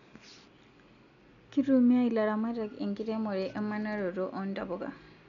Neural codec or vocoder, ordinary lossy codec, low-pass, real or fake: none; none; 7.2 kHz; real